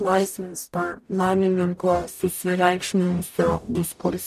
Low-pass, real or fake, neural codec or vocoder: 14.4 kHz; fake; codec, 44.1 kHz, 0.9 kbps, DAC